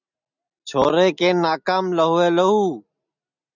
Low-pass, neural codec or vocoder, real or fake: 7.2 kHz; none; real